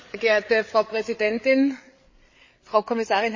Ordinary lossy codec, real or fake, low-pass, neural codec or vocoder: MP3, 32 kbps; fake; 7.2 kHz; codec, 16 kHz, 8 kbps, FreqCodec, larger model